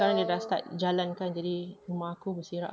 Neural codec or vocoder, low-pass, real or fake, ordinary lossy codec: none; none; real; none